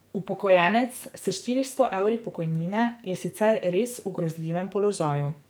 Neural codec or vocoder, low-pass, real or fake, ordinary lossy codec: codec, 44.1 kHz, 2.6 kbps, SNAC; none; fake; none